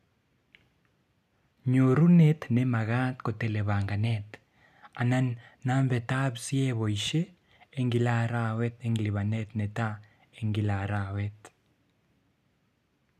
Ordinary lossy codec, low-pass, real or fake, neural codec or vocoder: none; 14.4 kHz; real; none